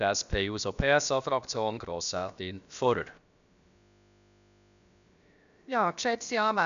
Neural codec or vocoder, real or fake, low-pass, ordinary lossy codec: codec, 16 kHz, about 1 kbps, DyCAST, with the encoder's durations; fake; 7.2 kHz; none